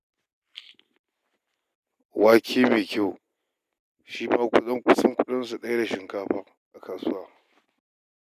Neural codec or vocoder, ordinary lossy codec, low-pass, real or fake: none; none; 14.4 kHz; real